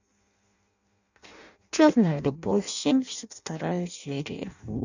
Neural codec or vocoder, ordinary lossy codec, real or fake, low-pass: codec, 16 kHz in and 24 kHz out, 0.6 kbps, FireRedTTS-2 codec; none; fake; 7.2 kHz